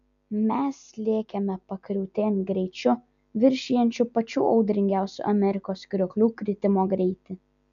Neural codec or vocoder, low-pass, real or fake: none; 7.2 kHz; real